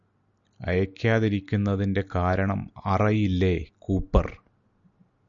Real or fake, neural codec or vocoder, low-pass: real; none; 7.2 kHz